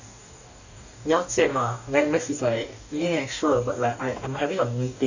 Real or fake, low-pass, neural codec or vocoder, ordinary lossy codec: fake; 7.2 kHz; codec, 44.1 kHz, 2.6 kbps, DAC; none